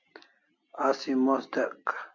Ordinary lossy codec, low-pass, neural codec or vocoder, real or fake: AAC, 48 kbps; 7.2 kHz; none; real